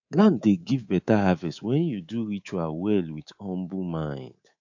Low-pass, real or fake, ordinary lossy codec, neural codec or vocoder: 7.2 kHz; fake; AAC, 48 kbps; codec, 24 kHz, 3.1 kbps, DualCodec